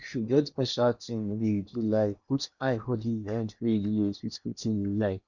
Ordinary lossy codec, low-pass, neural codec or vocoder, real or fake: none; 7.2 kHz; codec, 16 kHz in and 24 kHz out, 0.8 kbps, FocalCodec, streaming, 65536 codes; fake